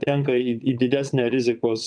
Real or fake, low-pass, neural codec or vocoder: fake; 9.9 kHz; vocoder, 22.05 kHz, 80 mel bands, WaveNeXt